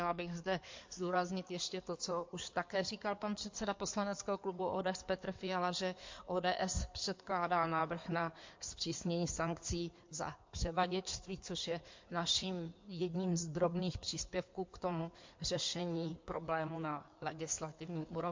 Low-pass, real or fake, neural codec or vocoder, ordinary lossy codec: 7.2 kHz; fake; codec, 16 kHz in and 24 kHz out, 2.2 kbps, FireRedTTS-2 codec; MP3, 48 kbps